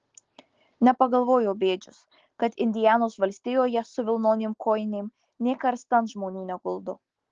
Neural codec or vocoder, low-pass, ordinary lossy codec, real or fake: none; 7.2 kHz; Opus, 16 kbps; real